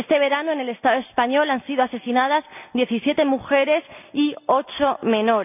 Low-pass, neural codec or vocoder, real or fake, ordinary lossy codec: 3.6 kHz; none; real; none